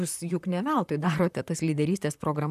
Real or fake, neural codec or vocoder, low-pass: fake; vocoder, 44.1 kHz, 128 mel bands, Pupu-Vocoder; 14.4 kHz